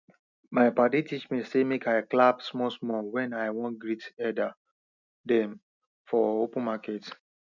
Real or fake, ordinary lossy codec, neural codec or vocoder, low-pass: real; none; none; 7.2 kHz